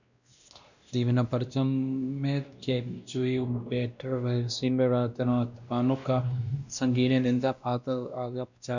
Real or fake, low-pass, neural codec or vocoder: fake; 7.2 kHz; codec, 16 kHz, 1 kbps, X-Codec, WavLM features, trained on Multilingual LibriSpeech